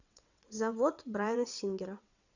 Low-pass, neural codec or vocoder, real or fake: 7.2 kHz; vocoder, 22.05 kHz, 80 mel bands, WaveNeXt; fake